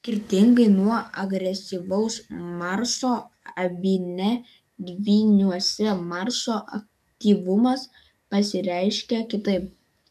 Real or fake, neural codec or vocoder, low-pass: fake; codec, 44.1 kHz, 7.8 kbps, DAC; 14.4 kHz